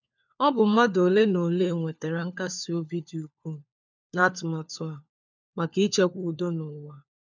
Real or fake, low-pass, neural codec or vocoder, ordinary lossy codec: fake; 7.2 kHz; codec, 16 kHz, 4 kbps, FunCodec, trained on LibriTTS, 50 frames a second; none